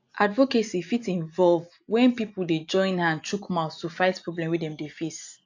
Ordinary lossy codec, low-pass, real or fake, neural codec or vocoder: AAC, 48 kbps; 7.2 kHz; real; none